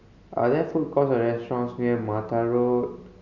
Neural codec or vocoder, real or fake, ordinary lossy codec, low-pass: none; real; none; 7.2 kHz